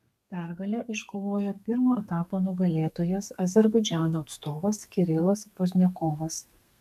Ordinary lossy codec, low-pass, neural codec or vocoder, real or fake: MP3, 96 kbps; 14.4 kHz; codec, 32 kHz, 1.9 kbps, SNAC; fake